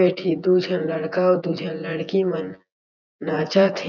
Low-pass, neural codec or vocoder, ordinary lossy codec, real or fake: 7.2 kHz; vocoder, 24 kHz, 100 mel bands, Vocos; none; fake